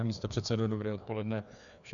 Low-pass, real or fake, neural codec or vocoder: 7.2 kHz; fake; codec, 16 kHz, 2 kbps, FreqCodec, larger model